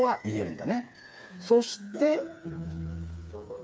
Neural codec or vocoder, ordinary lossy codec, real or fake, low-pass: codec, 16 kHz, 4 kbps, FreqCodec, smaller model; none; fake; none